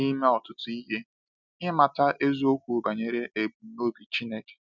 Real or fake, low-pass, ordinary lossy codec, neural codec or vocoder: real; 7.2 kHz; none; none